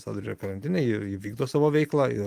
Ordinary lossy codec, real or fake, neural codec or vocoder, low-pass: Opus, 32 kbps; real; none; 14.4 kHz